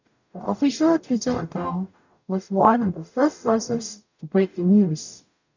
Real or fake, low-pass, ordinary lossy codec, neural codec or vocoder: fake; 7.2 kHz; none; codec, 44.1 kHz, 0.9 kbps, DAC